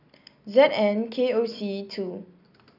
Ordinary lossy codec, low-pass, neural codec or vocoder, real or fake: none; 5.4 kHz; vocoder, 44.1 kHz, 128 mel bands every 256 samples, BigVGAN v2; fake